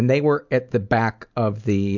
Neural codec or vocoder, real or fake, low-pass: none; real; 7.2 kHz